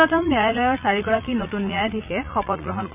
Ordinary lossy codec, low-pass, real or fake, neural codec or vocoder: none; 3.6 kHz; fake; vocoder, 44.1 kHz, 80 mel bands, Vocos